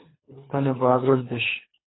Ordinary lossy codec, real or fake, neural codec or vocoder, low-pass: AAC, 16 kbps; fake; codec, 24 kHz, 3 kbps, HILCodec; 7.2 kHz